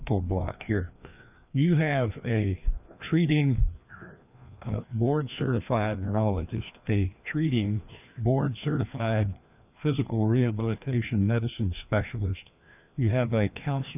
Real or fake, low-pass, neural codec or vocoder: fake; 3.6 kHz; codec, 16 kHz, 2 kbps, FreqCodec, larger model